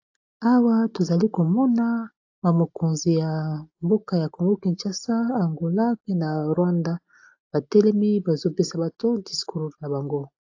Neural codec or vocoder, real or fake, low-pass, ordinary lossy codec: none; real; 7.2 kHz; AAC, 48 kbps